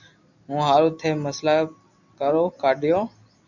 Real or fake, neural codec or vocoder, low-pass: real; none; 7.2 kHz